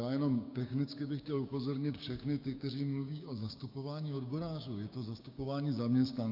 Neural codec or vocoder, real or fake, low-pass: codec, 16 kHz, 6 kbps, DAC; fake; 5.4 kHz